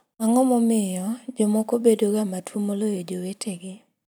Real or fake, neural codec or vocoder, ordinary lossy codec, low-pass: fake; vocoder, 44.1 kHz, 128 mel bands every 256 samples, BigVGAN v2; none; none